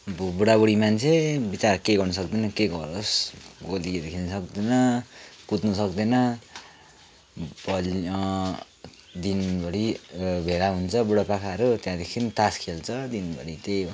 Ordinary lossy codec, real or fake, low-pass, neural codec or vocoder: none; real; none; none